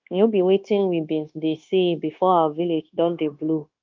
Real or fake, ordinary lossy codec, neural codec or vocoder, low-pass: fake; Opus, 32 kbps; codec, 16 kHz, 0.9 kbps, LongCat-Audio-Codec; 7.2 kHz